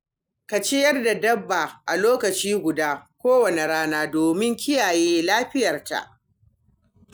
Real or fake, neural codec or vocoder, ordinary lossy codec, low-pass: real; none; none; none